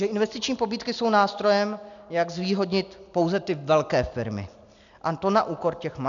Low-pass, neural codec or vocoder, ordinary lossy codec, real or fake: 7.2 kHz; none; MP3, 96 kbps; real